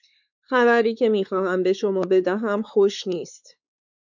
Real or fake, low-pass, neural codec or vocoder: fake; 7.2 kHz; codec, 16 kHz, 4 kbps, X-Codec, WavLM features, trained on Multilingual LibriSpeech